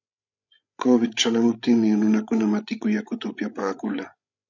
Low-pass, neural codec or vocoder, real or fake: 7.2 kHz; codec, 16 kHz, 16 kbps, FreqCodec, larger model; fake